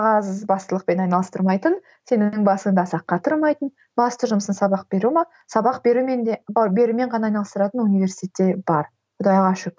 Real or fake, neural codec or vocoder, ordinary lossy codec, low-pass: real; none; none; none